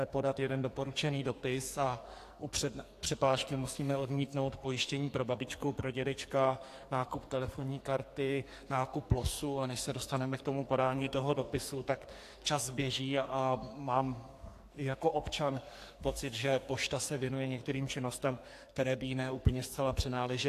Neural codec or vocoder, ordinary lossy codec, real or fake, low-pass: codec, 44.1 kHz, 2.6 kbps, SNAC; AAC, 64 kbps; fake; 14.4 kHz